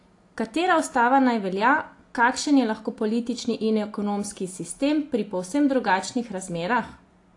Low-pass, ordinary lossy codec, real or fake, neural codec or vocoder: 10.8 kHz; AAC, 48 kbps; real; none